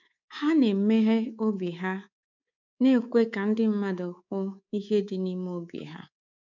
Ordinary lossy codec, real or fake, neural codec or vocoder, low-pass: none; fake; codec, 24 kHz, 3.1 kbps, DualCodec; 7.2 kHz